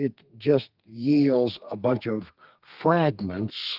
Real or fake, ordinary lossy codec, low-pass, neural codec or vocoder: fake; Opus, 32 kbps; 5.4 kHz; codec, 32 kHz, 1.9 kbps, SNAC